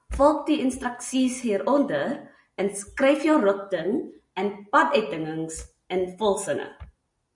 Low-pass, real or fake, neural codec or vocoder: 10.8 kHz; real; none